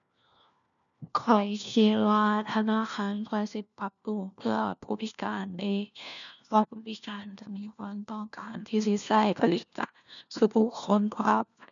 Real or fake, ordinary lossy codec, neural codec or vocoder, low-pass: fake; none; codec, 16 kHz, 1 kbps, FunCodec, trained on LibriTTS, 50 frames a second; 7.2 kHz